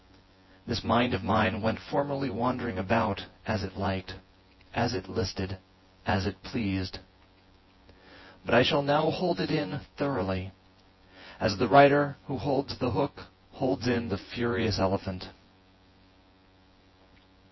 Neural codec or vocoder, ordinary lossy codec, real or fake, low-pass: vocoder, 24 kHz, 100 mel bands, Vocos; MP3, 24 kbps; fake; 7.2 kHz